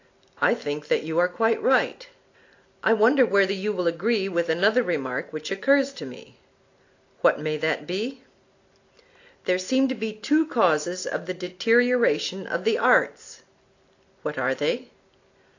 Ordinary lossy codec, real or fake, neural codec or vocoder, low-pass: AAC, 48 kbps; real; none; 7.2 kHz